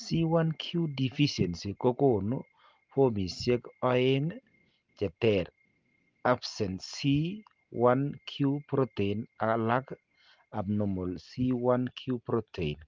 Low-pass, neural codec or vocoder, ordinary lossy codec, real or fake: 7.2 kHz; none; Opus, 32 kbps; real